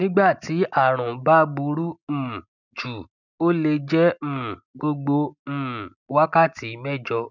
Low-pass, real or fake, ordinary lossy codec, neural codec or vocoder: 7.2 kHz; real; none; none